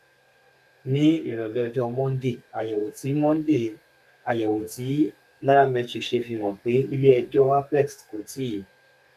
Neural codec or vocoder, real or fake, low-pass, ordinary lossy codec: codec, 32 kHz, 1.9 kbps, SNAC; fake; 14.4 kHz; none